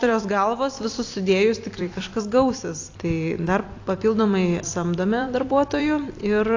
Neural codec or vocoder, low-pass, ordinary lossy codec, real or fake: vocoder, 44.1 kHz, 128 mel bands every 256 samples, BigVGAN v2; 7.2 kHz; AAC, 48 kbps; fake